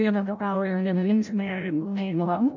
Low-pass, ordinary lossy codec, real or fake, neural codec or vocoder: 7.2 kHz; MP3, 64 kbps; fake; codec, 16 kHz, 0.5 kbps, FreqCodec, larger model